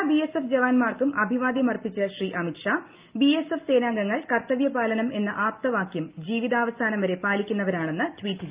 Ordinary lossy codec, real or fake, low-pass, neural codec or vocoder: Opus, 32 kbps; real; 3.6 kHz; none